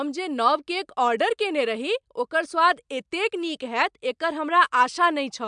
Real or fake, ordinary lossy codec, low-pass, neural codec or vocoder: real; MP3, 96 kbps; 9.9 kHz; none